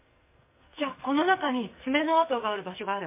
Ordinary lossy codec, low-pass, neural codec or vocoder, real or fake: MP3, 24 kbps; 3.6 kHz; codec, 44.1 kHz, 2.6 kbps, SNAC; fake